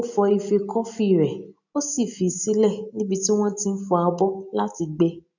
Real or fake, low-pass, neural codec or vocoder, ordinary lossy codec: real; 7.2 kHz; none; none